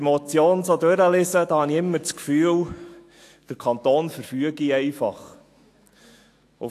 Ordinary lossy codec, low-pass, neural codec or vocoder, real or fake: AAC, 64 kbps; 14.4 kHz; autoencoder, 48 kHz, 128 numbers a frame, DAC-VAE, trained on Japanese speech; fake